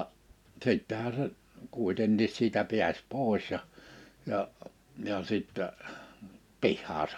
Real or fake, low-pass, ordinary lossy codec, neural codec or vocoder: real; 19.8 kHz; none; none